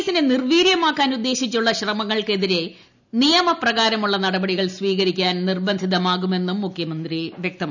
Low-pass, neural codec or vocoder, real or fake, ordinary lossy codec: 7.2 kHz; none; real; none